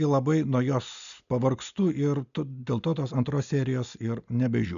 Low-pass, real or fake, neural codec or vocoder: 7.2 kHz; real; none